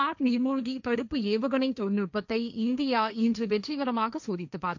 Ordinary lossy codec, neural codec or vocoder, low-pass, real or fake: none; codec, 16 kHz, 1.1 kbps, Voila-Tokenizer; 7.2 kHz; fake